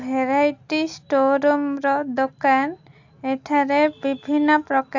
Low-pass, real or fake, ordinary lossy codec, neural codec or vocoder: 7.2 kHz; real; AAC, 48 kbps; none